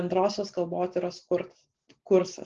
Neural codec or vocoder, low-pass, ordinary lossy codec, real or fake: none; 7.2 kHz; Opus, 16 kbps; real